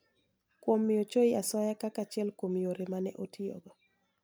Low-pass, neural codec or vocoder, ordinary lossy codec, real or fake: none; none; none; real